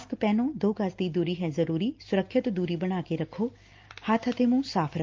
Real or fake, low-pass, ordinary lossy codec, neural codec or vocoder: real; 7.2 kHz; Opus, 32 kbps; none